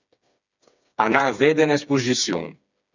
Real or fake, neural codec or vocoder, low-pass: fake; codec, 16 kHz, 4 kbps, FreqCodec, smaller model; 7.2 kHz